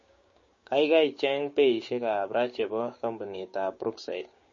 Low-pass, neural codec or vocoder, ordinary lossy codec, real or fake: 7.2 kHz; codec, 16 kHz, 6 kbps, DAC; MP3, 32 kbps; fake